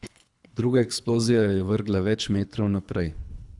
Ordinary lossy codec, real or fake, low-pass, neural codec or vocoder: none; fake; 10.8 kHz; codec, 24 kHz, 3 kbps, HILCodec